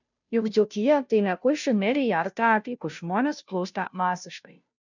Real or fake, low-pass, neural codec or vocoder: fake; 7.2 kHz; codec, 16 kHz, 0.5 kbps, FunCodec, trained on Chinese and English, 25 frames a second